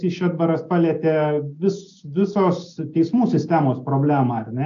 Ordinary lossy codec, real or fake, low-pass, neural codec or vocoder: AAC, 64 kbps; real; 7.2 kHz; none